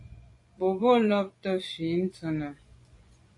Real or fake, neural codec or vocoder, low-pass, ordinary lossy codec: fake; vocoder, 24 kHz, 100 mel bands, Vocos; 10.8 kHz; AAC, 48 kbps